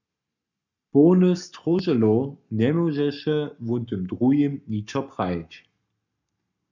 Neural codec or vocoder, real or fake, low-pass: codec, 44.1 kHz, 7.8 kbps, Pupu-Codec; fake; 7.2 kHz